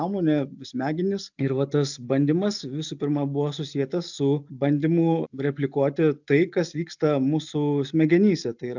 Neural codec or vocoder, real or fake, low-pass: none; real; 7.2 kHz